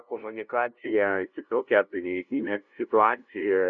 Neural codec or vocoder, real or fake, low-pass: codec, 16 kHz, 0.5 kbps, FunCodec, trained on LibriTTS, 25 frames a second; fake; 7.2 kHz